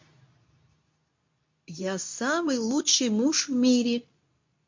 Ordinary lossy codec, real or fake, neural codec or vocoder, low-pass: MP3, 48 kbps; fake; codec, 24 kHz, 0.9 kbps, WavTokenizer, medium speech release version 2; 7.2 kHz